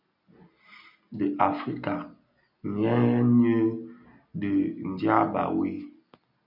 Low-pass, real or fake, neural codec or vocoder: 5.4 kHz; real; none